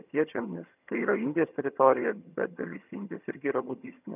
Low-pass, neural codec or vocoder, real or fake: 3.6 kHz; vocoder, 22.05 kHz, 80 mel bands, HiFi-GAN; fake